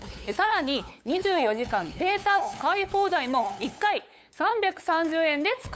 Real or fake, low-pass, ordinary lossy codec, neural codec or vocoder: fake; none; none; codec, 16 kHz, 8 kbps, FunCodec, trained on LibriTTS, 25 frames a second